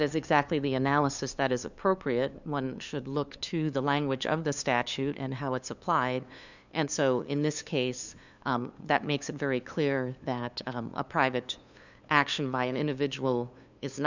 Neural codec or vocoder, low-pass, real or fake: codec, 16 kHz, 2 kbps, FunCodec, trained on LibriTTS, 25 frames a second; 7.2 kHz; fake